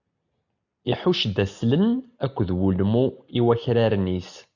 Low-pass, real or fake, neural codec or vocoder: 7.2 kHz; real; none